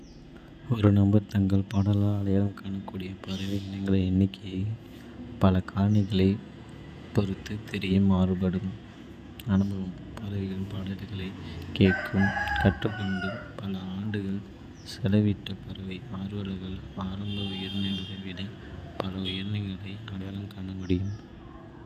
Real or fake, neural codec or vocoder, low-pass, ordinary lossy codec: fake; vocoder, 48 kHz, 128 mel bands, Vocos; 14.4 kHz; none